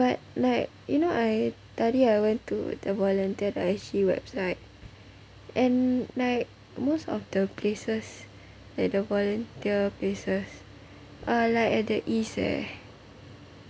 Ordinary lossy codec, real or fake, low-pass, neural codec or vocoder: none; real; none; none